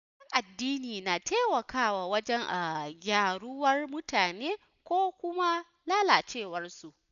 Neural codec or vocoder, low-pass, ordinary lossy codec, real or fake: none; 7.2 kHz; none; real